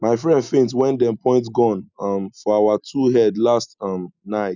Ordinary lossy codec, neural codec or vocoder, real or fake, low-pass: none; none; real; 7.2 kHz